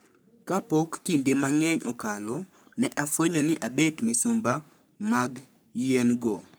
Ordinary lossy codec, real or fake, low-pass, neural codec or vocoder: none; fake; none; codec, 44.1 kHz, 3.4 kbps, Pupu-Codec